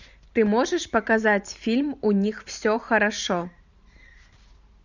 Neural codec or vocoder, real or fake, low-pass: vocoder, 44.1 kHz, 128 mel bands every 512 samples, BigVGAN v2; fake; 7.2 kHz